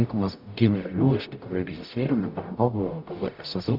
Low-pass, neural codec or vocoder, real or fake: 5.4 kHz; codec, 44.1 kHz, 0.9 kbps, DAC; fake